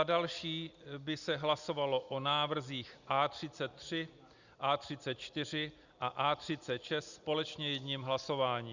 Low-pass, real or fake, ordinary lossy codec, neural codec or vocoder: 7.2 kHz; real; Opus, 64 kbps; none